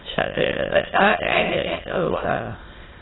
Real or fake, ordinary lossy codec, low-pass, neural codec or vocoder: fake; AAC, 16 kbps; 7.2 kHz; autoencoder, 22.05 kHz, a latent of 192 numbers a frame, VITS, trained on many speakers